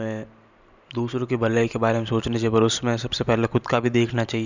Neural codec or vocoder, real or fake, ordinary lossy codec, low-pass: vocoder, 44.1 kHz, 128 mel bands every 512 samples, BigVGAN v2; fake; none; 7.2 kHz